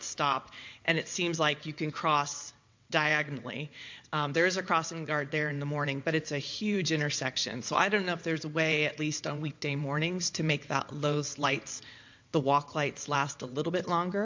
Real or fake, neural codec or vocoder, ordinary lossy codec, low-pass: fake; vocoder, 22.05 kHz, 80 mel bands, WaveNeXt; MP3, 48 kbps; 7.2 kHz